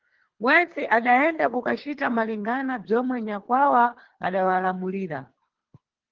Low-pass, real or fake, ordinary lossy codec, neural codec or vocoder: 7.2 kHz; fake; Opus, 16 kbps; codec, 24 kHz, 3 kbps, HILCodec